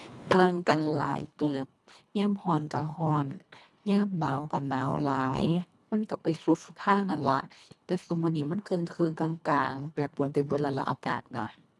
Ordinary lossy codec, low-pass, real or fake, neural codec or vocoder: none; none; fake; codec, 24 kHz, 1.5 kbps, HILCodec